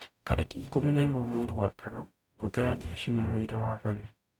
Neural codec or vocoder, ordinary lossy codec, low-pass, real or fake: codec, 44.1 kHz, 0.9 kbps, DAC; none; 19.8 kHz; fake